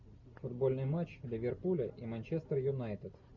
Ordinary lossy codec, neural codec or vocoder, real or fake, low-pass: MP3, 64 kbps; none; real; 7.2 kHz